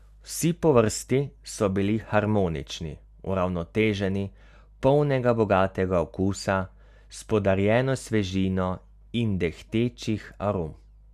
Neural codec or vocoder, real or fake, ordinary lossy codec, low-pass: none; real; none; 14.4 kHz